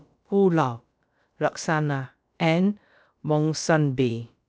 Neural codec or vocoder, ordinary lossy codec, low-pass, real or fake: codec, 16 kHz, about 1 kbps, DyCAST, with the encoder's durations; none; none; fake